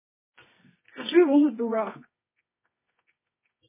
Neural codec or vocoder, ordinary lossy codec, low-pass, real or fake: codec, 24 kHz, 0.9 kbps, WavTokenizer, medium music audio release; MP3, 16 kbps; 3.6 kHz; fake